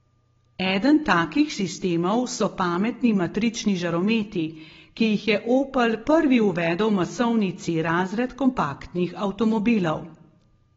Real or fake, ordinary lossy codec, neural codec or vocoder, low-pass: real; AAC, 24 kbps; none; 7.2 kHz